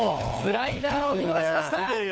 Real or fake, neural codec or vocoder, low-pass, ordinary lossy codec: fake; codec, 16 kHz, 4 kbps, FunCodec, trained on LibriTTS, 50 frames a second; none; none